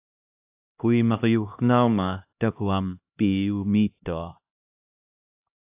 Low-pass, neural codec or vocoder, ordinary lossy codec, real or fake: 3.6 kHz; codec, 16 kHz, 1 kbps, X-Codec, HuBERT features, trained on LibriSpeech; AAC, 32 kbps; fake